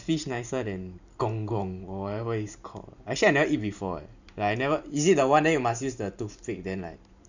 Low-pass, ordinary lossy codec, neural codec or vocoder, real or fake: 7.2 kHz; none; vocoder, 44.1 kHz, 128 mel bands every 512 samples, BigVGAN v2; fake